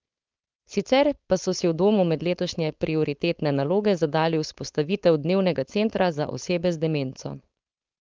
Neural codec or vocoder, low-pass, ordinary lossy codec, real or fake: codec, 16 kHz, 4.8 kbps, FACodec; 7.2 kHz; Opus, 32 kbps; fake